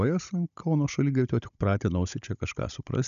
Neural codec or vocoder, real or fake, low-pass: codec, 16 kHz, 16 kbps, FunCodec, trained on Chinese and English, 50 frames a second; fake; 7.2 kHz